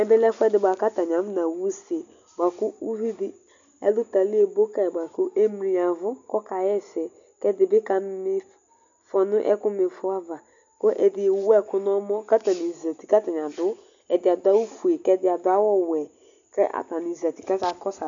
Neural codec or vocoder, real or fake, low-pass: none; real; 7.2 kHz